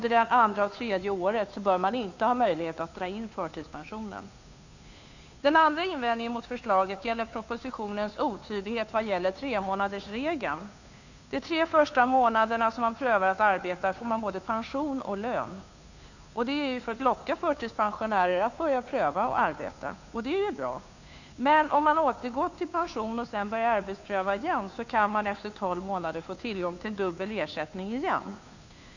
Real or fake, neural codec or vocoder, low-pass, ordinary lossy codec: fake; codec, 16 kHz, 2 kbps, FunCodec, trained on Chinese and English, 25 frames a second; 7.2 kHz; none